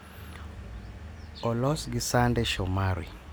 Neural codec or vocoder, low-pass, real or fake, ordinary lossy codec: none; none; real; none